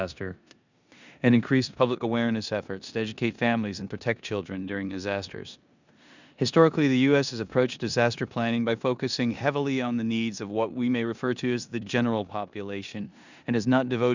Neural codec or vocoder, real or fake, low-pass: codec, 16 kHz in and 24 kHz out, 0.9 kbps, LongCat-Audio-Codec, four codebook decoder; fake; 7.2 kHz